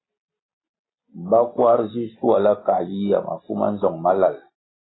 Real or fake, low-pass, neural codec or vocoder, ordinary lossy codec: real; 7.2 kHz; none; AAC, 16 kbps